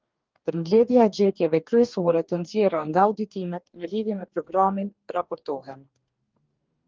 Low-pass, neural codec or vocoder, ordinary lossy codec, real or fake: 7.2 kHz; codec, 44.1 kHz, 2.6 kbps, DAC; Opus, 32 kbps; fake